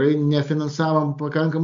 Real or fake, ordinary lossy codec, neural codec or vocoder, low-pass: real; AAC, 64 kbps; none; 7.2 kHz